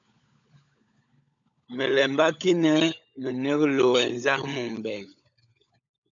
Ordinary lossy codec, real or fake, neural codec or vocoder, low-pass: MP3, 96 kbps; fake; codec, 16 kHz, 16 kbps, FunCodec, trained on LibriTTS, 50 frames a second; 7.2 kHz